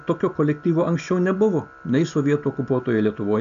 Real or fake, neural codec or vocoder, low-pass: real; none; 7.2 kHz